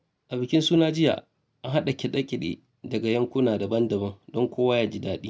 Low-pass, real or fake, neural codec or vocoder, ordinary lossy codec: none; real; none; none